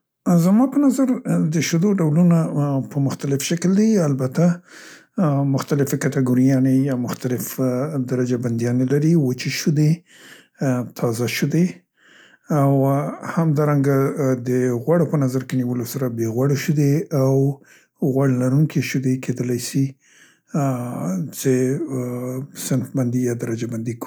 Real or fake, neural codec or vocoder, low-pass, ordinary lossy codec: real; none; none; none